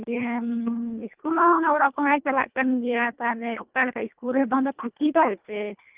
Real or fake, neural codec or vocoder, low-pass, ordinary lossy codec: fake; codec, 24 kHz, 1.5 kbps, HILCodec; 3.6 kHz; Opus, 32 kbps